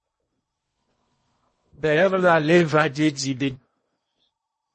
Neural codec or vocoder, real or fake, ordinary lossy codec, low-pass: codec, 16 kHz in and 24 kHz out, 0.8 kbps, FocalCodec, streaming, 65536 codes; fake; MP3, 32 kbps; 10.8 kHz